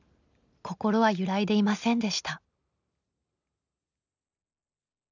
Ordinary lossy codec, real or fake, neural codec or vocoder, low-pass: none; real; none; 7.2 kHz